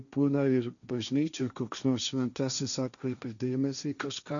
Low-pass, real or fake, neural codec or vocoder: 7.2 kHz; fake; codec, 16 kHz, 1.1 kbps, Voila-Tokenizer